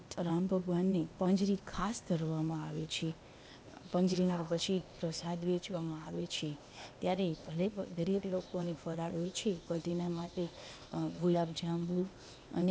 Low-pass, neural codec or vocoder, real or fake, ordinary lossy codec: none; codec, 16 kHz, 0.8 kbps, ZipCodec; fake; none